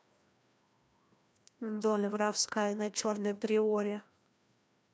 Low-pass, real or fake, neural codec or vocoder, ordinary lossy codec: none; fake; codec, 16 kHz, 1 kbps, FreqCodec, larger model; none